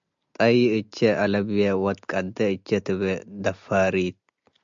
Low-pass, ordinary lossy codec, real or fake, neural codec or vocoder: 7.2 kHz; MP3, 64 kbps; real; none